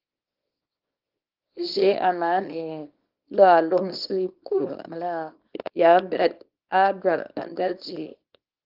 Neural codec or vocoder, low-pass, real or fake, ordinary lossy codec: codec, 24 kHz, 0.9 kbps, WavTokenizer, small release; 5.4 kHz; fake; Opus, 32 kbps